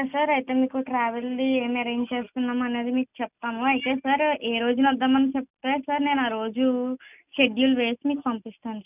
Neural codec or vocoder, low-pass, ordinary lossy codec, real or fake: none; 3.6 kHz; none; real